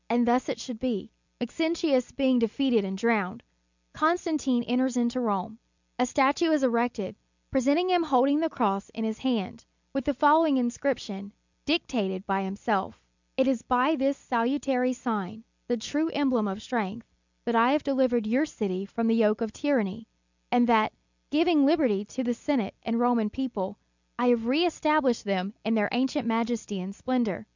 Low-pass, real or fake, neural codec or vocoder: 7.2 kHz; real; none